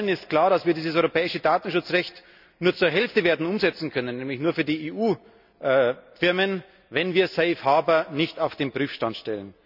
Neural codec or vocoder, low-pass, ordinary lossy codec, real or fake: none; 5.4 kHz; none; real